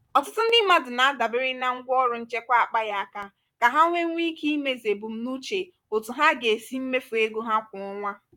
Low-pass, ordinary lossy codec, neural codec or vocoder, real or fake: 19.8 kHz; none; vocoder, 44.1 kHz, 128 mel bands, Pupu-Vocoder; fake